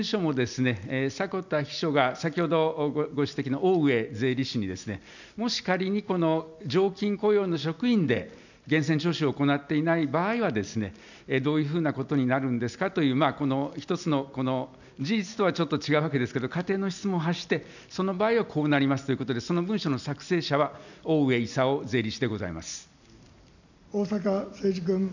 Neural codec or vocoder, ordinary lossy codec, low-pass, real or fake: none; none; 7.2 kHz; real